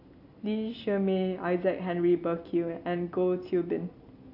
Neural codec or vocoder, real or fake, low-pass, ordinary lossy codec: none; real; 5.4 kHz; AAC, 48 kbps